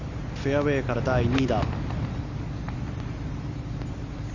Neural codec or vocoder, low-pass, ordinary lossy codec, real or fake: none; 7.2 kHz; none; real